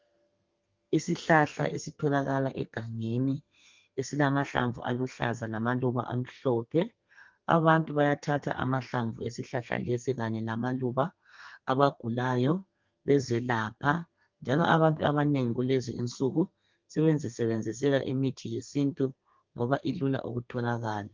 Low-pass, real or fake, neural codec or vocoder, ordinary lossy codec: 7.2 kHz; fake; codec, 32 kHz, 1.9 kbps, SNAC; Opus, 24 kbps